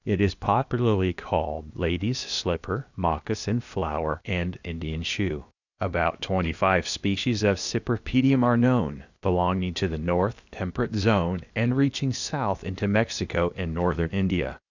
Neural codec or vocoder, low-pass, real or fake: codec, 16 kHz, 0.8 kbps, ZipCodec; 7.2 kHz; fake